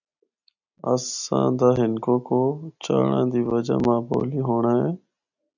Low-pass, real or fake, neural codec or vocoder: 7.2 kHz; real; none